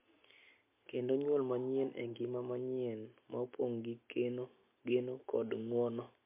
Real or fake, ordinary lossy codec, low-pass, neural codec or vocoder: real; MP3, 24 kbps; 3.6 kHz; none